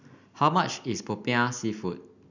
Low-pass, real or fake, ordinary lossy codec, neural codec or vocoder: 7.2 kHz; real; none; none